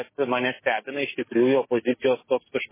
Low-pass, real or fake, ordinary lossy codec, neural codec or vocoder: 3.6 kHz; fake; MP3, 16 kbps; codec, 16 kHz, 6 kbps, DAC